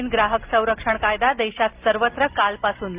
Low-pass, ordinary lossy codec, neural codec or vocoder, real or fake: 3.6 kHz; Opus, 32 kbps; none; real